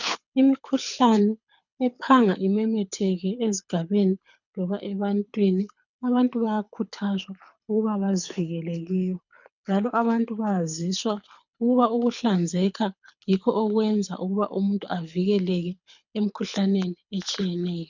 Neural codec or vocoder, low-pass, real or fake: codec, 24 kHz, 6 kbps, HILCodec; 7.2 kHz; fake